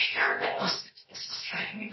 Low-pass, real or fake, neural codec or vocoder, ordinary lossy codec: 7.2 kHz; fake; codec, 16 kHz, 0.7 kbps, FocalCodec; MP3, 24 kbps